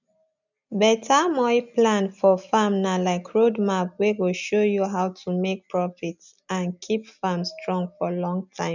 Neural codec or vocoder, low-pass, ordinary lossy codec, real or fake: none; 7.2 kHz; none; real